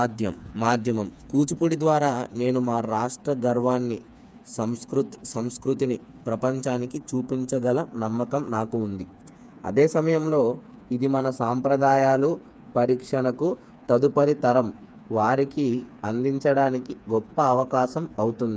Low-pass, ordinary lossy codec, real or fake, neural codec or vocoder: none; none; fake; codec, 16 kHz, 4 kbps, FreqCodec, smaller model